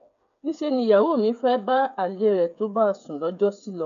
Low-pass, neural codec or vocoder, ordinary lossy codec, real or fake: 7.2 kHz; codec, 16 kHz, 8 kbps, FreqCodec, smaller model; none; fake